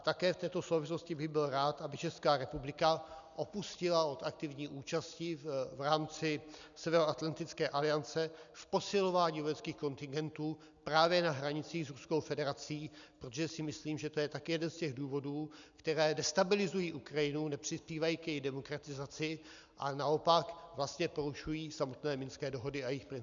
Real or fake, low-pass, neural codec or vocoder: real; 7.2 kHz; none